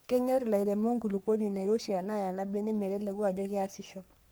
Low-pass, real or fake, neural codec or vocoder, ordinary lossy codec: none; fake; codec, 44.1 kHz, 3.4 kbps, Pupu-Codec; none